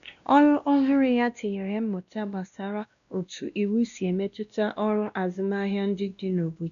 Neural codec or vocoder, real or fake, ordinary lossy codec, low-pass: codec, 16 kHz, 1 kbps, X-Codec, WavLM features, trained on Multilingual LibriSpeech; fake; AAC, 96 kbps; 7.2 kHz